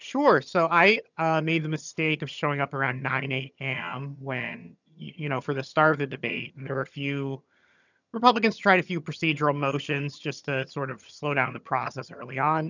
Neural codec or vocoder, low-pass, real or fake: vocoder, 22.05 kHz, 80 mel bands, HiFi-GAN; 7.2 kHz; fake